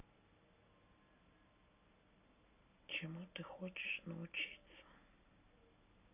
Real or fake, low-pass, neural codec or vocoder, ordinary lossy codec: real; 3.6 kHz; none; none